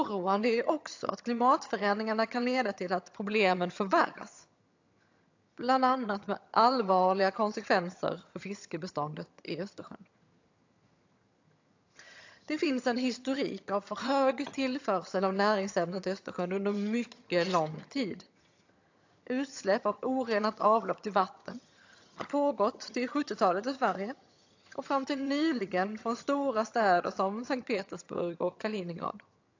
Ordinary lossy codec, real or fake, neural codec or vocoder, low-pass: AAC, 48 kbps; fake; vocoder, 22.05 kHz, 80 mel bands, HiFi-GAN; 7.2 kHz